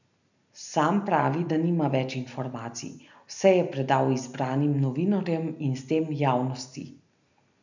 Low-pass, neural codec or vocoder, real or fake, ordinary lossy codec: 7.2 kHz; none; real; none